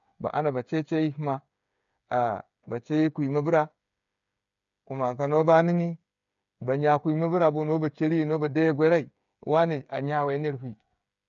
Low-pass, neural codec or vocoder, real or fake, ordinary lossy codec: 7.2 kHz; codec, 16 kHz, 8 kbps, FreqCodec, smaller model; fake; none